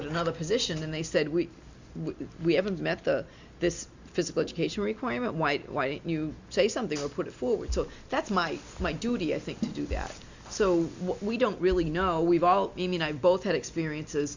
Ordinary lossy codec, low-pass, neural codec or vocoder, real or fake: Opus, 64 kbps; 7.2 kHz; none; real